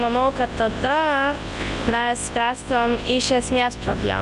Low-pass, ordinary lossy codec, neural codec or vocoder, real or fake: 10.8 kHz; Opus, 64 kbps; codec, 24 kHz, 0.9 kbps, WavTokenizer, large speech release; fake